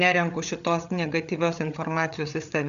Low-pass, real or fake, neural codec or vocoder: 7.2 kHz; fake; codec, 16 kHz, 8 kbps, FreqCodec, larger model